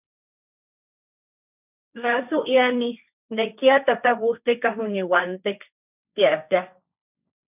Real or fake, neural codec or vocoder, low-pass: fake; codec, 16 kHz, 1.1 kbps, Voila-Tokenizer; 3.6 kHz